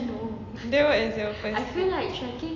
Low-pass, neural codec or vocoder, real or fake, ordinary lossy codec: 7.2 kHz; none; real; AAC, 32 kbps